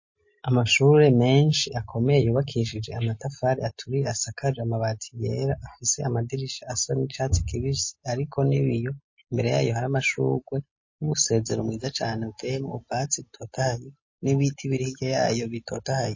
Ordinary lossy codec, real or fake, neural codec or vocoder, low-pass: MP3, 32 kbps; real; none; 7.2 kHz